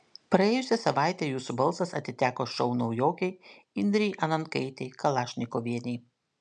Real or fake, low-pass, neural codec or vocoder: real; 9.9 kHz; none